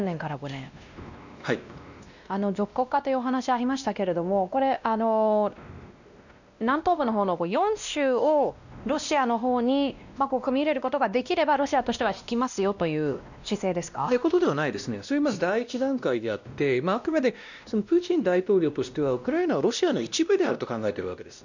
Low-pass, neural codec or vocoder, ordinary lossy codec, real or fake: 7.2 kHz; codec, 16 kHz, 1 kbps, X-Codec, WavLM features, trained on Multilingual LibriSpeech; none; fake